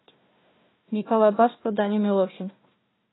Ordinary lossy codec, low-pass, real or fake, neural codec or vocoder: AAC, 16 kbps; 7.2 kHz; fake; codec, 16 kHz, 1 kbps, FunCodec, trained on Chinese and English, 50 frames a second